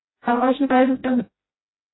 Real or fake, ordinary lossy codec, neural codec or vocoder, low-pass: fake; AAC, 16 kbps; codec, 16 kHz, 0.5 kbps, FreqCodec, smaller model; 7.2 kHz